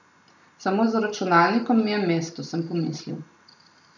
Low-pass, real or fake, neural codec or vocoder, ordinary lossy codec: 7.2 kHz; real; none; none